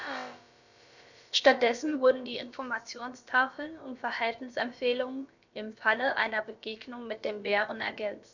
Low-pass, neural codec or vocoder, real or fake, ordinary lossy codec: 7.2 kHz; codec, 16 kHz, about 1 kbps, DyCAST, with the encoder's durations; fake; none